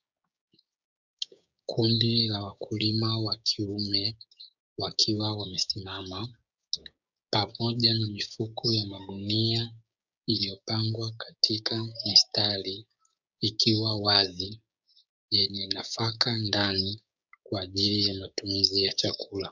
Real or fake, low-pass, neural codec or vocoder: fake; 7.2 kHz; codec, 16 kHz, 6 kbps, DAC